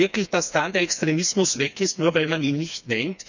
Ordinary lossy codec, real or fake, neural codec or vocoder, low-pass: none; fake; codec, 16 kHz, 2 kbps, FreqCodec, smaller model; 7.2 kHz